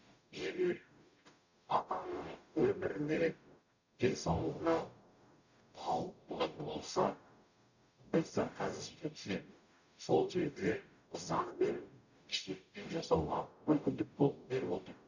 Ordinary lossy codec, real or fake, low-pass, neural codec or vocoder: none; fake; 7.2 kHz; codec, 44.1 kHz, 0.9 kbps, DAC